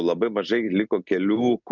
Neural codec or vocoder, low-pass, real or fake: none; 7.2 kHz; real